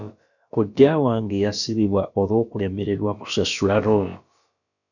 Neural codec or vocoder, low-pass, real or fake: codec, 16 kHz, about 1 kbps, DyCAST, with the encoder's durations; 7.2 kHz; fake